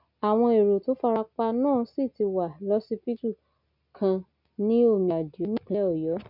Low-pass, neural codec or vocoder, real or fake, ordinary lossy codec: 5.4 kHz; none; real; none